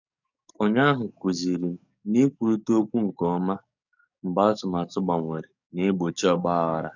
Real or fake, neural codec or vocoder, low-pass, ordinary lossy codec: fake; codec, 44.1 kHz, 7.8 kbps, DAC; 7.2 kHz; none